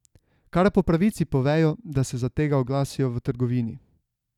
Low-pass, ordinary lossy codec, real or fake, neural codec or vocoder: 19.8 kHz; none; real; none